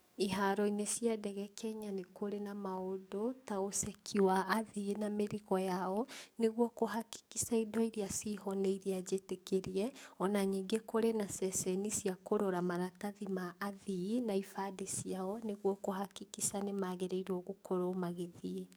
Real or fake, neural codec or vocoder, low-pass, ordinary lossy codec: fake; codec, 44.1 kHz, 7.8 kbps, DAC; none; none